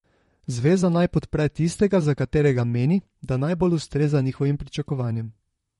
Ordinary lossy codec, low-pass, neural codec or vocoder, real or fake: MP3, 48 kbps; 19.8 kHz; vocoder, 44.1 kHz, 128 mel bands, Pupu-Vocoder; fake